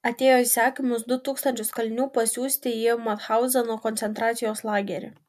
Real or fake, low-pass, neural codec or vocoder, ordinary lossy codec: real; 14.4 kHz; none; MP3, 96 kbps